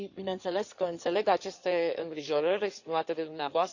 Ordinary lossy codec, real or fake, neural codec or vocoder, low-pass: none; fake; codec, 16 kHz in and 24 kHz out, 2.2 kbps, FireRedTTS-2 codec; 7.2 kHz